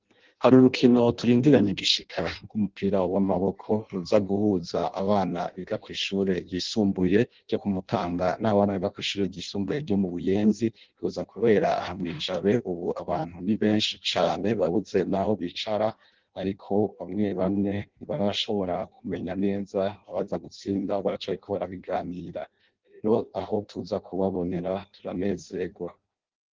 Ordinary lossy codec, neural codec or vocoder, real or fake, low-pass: Opus, 16 kbps; codec, 16 kHz in and 24 kHz out, 0.6 kbps, FireRedTTS-2 codec; fake; 7.2 kHz